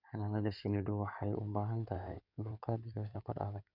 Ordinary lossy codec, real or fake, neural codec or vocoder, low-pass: MP3, 48 kbps; fake; codec, 16 kHz in and 24 kHz out, 2.2 kbps, FireRedTTS-2 codec; 5.4 kHz